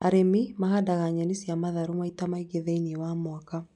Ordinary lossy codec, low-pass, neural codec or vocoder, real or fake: none; 10.8 kHz; none; real